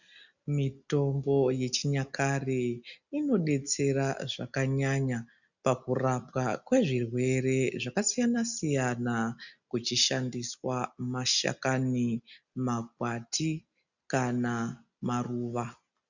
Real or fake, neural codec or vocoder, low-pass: real; none; 7.2 kHz